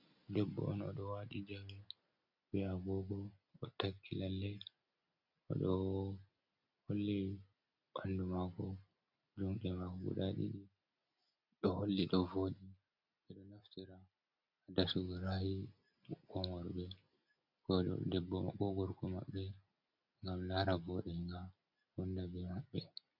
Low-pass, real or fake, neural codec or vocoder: 5.4 kHz; real; none